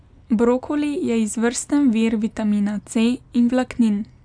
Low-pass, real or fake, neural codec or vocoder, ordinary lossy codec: 9.9 kHz; real; none; none